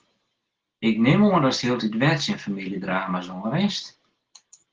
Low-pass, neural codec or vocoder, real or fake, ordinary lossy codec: 7.2 kHz; none; real; Opus, 16 kbps